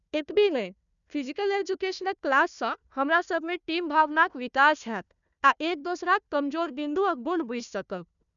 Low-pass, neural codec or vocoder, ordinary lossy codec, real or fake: 7.2 kHz; codec, 16 kHz, 1 kbps, FunCodec, trained on Chinese and English, 50 frames a second; none; fake